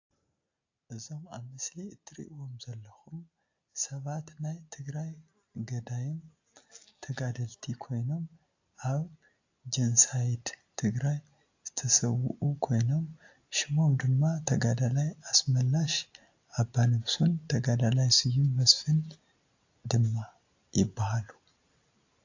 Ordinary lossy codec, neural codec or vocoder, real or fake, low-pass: AAC, 48 kbps; none; real; 7.2 kHz